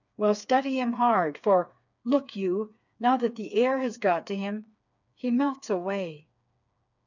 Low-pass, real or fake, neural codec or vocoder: 7.2 kHz; fake; codec, 16 kHz, 4 kbps, FreqCodec, smaller model